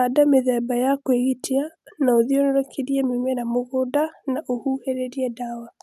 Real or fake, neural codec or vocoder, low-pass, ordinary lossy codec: real; none; 14.4 kHz; none